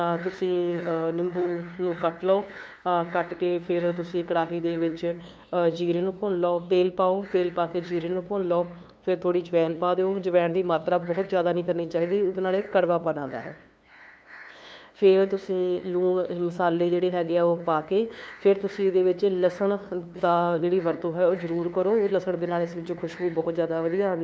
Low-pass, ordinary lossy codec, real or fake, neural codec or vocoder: none; none; fake; codec, 16 kHz, 2 kbps, FunCodec, trained on LibriTTS, 25 frames a second